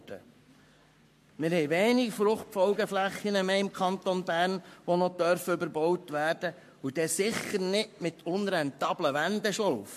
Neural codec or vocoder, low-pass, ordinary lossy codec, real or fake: codec, 44.1 kHz, 7.8 kbps, Pupu-Codec; 14.4 kHz; MP3, 64 kbps; fake